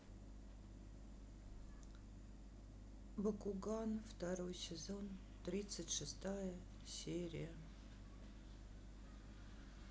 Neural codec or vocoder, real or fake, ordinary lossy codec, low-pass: none; real; none; none